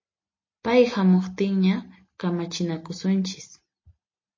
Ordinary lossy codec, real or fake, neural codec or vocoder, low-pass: MP3, 32 kbps; real; none; 7.2 kHz